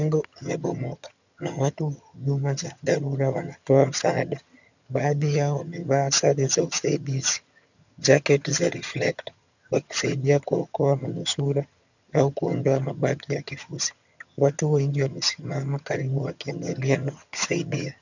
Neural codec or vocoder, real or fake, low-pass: vocoder, 22.05 kHz, 80 mel bands, HiFi-GAN; fake; 7.2 kHz